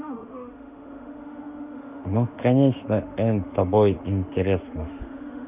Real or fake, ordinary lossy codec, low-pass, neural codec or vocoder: fake; none; 3.6 kHz; autoencoder, 48 kHz, 32 numbers a frame, DAC-VAE, trained on Japanese speech